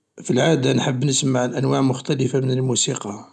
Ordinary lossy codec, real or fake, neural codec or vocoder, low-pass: none; real; none; none